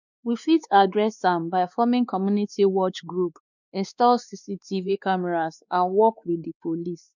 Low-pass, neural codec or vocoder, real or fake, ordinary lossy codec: 7.2 kHz; codec, 16 kHz, 2 kbps, X-Codec, WavLM features, trained on Multilingual LibriSpeech; fake; none